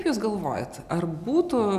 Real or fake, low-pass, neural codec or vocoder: real; 14.4 kHz; none